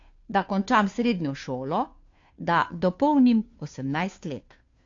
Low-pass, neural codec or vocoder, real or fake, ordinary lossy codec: 7.2 kHz; codec, 16 kHz, 2 kbps, FunCodec, trained on Chinese and English, 25 frames a second; fake; AAC, 48 kbps